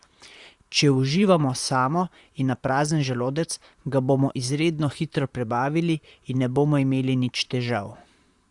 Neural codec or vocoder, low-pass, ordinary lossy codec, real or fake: vocoder, 44.1 kHz, 128 mel bands, Pupu-Vocoder; 10.8 kHz; Opus, 64 kbps; fake